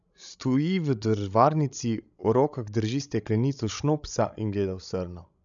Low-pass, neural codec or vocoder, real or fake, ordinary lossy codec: 7.2 kHz; codec, 16 kHz, 16 kbps, FreqCodec, larger model; fake; none